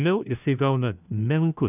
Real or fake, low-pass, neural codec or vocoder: fake; 3.6 kHz; codec, 16 kHz, 1 kbps, FunCodec, trained on LibriTTS, 50 frames a second